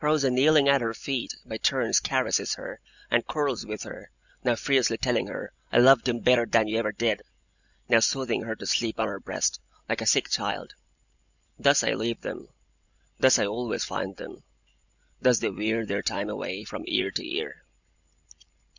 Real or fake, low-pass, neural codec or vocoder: real; 7.2 kHz; none